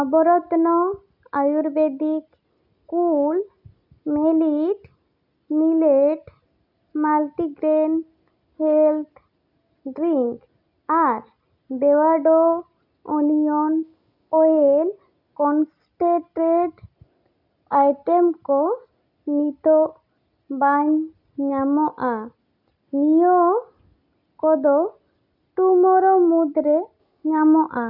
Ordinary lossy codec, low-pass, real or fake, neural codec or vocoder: none; 5.4 kHz; real; none